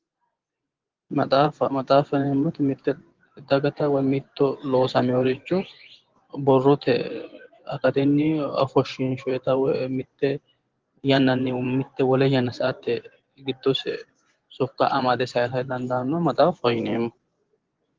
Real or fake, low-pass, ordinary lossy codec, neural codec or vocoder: fake; 7.2 kHz; Opus, 16 kbps; vocoder, 24 kHz, 100 mel bands, Vocos